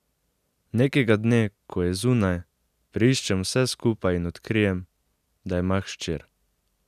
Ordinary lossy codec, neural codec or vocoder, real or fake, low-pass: none; none; real; 14.4 kHz